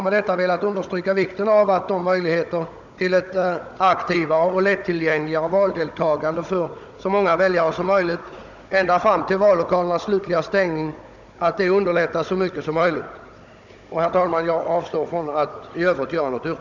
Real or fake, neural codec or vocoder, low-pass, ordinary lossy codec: fake; codec, 16 kHz, 16 kbps, FunCodec, trained on Chinese and English, 50 frames a second; 7.2 kHz; none